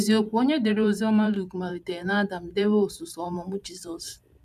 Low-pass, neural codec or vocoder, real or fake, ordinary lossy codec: 14.4 kHz; vocoder, 44.1 kHz, 128 mel bands every 512 samples, BigVGAN v2; fake; none